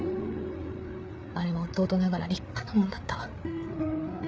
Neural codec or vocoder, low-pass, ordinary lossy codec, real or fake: codec, 16 kHz, 8 kbps, FreqCodec, larger model; none; none; fake